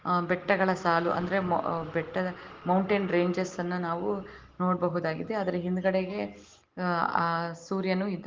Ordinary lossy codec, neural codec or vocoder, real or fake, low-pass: Opus, 16 kbps; none; real; 7.2 kHz